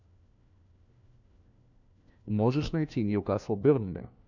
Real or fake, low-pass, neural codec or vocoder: fake; 7.2 kHz; codec, 16 kHz, 1 kbps, FunCodec, trained on LibriTTS, 50 frames a second